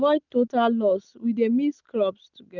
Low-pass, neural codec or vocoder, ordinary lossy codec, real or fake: 7.2 kHz; none; none; real